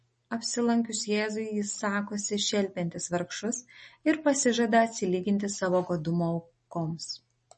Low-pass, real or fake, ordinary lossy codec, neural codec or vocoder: 10.8 kHz; real; MP3, 32 kbps; none